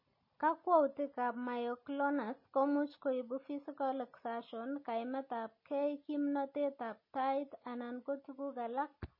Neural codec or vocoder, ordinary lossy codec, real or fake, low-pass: none; MP3, 24 kbps; real; 5.4 kHz